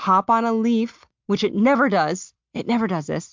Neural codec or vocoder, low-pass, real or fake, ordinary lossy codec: none; 7.2 kHz; real; MP3, 64 kbps